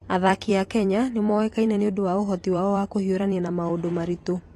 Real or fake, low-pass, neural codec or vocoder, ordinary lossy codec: fake; 14.4 kHz; vocoder, 44.1 kHz, 128 mel bands every 256 samples, BigVGAN v2; AAC, 48 kbps